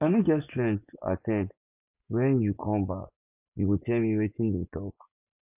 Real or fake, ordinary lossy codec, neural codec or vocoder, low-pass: fake; none; vocoder, 24 kHz, 100 mel bands, Vocos; 3.6 kHz